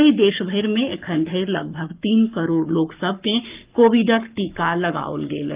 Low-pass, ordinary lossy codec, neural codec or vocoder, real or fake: 3.6 kHz; Opus, 24 kbps; codec, 44.1 kHz, 7.8 kbps, Pupu-Codec; fake